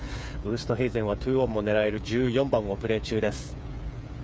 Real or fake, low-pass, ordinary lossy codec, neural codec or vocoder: fake; none; none; codec, 16 kHz, 8 kbps, FreqCodec, smaller model